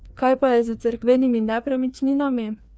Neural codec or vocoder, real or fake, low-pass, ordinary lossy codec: codec, 16 kHz, 2 kbps, FreqCodec, larger model; fake; none; none